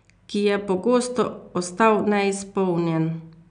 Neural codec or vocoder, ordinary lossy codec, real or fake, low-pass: none; none; real; 9.9 kHz